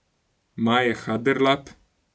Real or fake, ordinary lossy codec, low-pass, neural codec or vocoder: real; none; none; none